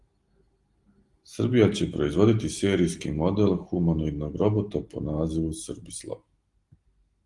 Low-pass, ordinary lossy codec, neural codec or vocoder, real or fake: 10.8 kHz; Opus, 24 kbps; none; real